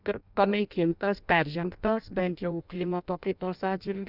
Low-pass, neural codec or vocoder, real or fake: 5.4 kHz; codec, 16 kHz in and 24 kHz out, 0.6 kbps, FireRedTTS-2 codec; fake